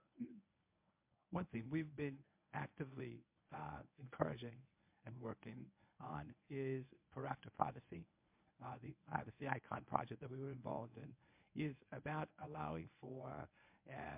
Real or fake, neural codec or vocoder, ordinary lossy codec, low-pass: fake; codec, 24 kHz, 0.9 kbps, WavTokenizer, medium speech release version 1; MP3, 32 kbps; 3.6 kHz